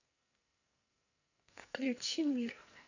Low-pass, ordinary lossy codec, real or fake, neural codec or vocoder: 7.2 kHz; none; fake; codec, 44.1 kHz, 2.6 kbps, SNAC